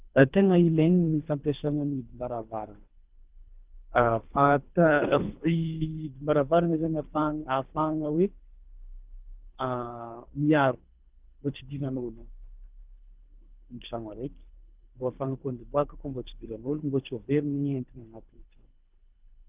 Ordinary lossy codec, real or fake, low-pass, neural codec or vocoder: Opus, 32 kbps; fake; 3.6 kHz; codec, 24 kHz, 3 kbps, HILCodec